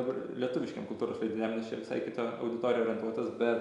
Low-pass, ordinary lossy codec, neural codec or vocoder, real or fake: 10.8 kHz; Opus, 64 kbps; none; real